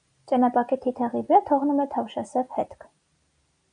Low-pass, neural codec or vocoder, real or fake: 9.9 kHz; none; real